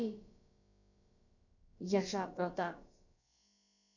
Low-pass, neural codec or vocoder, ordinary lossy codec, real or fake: 7.2 kHz; codec, 16 kHz, about 1 kbps, DyCAST, with the encoder's durations; none; fake